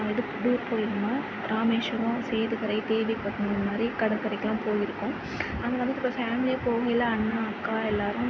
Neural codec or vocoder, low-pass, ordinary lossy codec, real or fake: none; none; none; real